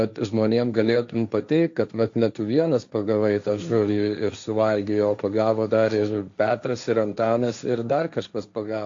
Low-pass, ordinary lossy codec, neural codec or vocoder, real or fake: 7.2 kHz; AAC, 64 kbps; codec, 16 kHz, 1.1 kbps, Voila-Tokenizer; fake